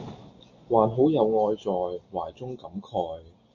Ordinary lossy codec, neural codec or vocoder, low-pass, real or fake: AAC, 48 kbps; none; 7.2 kHz; real